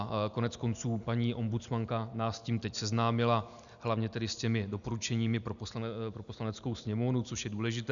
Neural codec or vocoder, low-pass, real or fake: none; 7.2 kHz; real